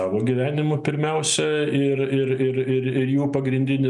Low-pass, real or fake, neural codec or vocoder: 10.8 kHz; real; none